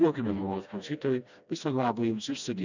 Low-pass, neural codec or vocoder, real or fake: 7.2 kHz; codec, 16 kHz, 1 kbps, FreqCodec, smaller model; fake